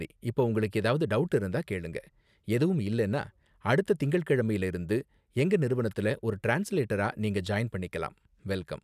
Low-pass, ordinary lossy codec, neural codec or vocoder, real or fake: 14.4 kHz; none; none; real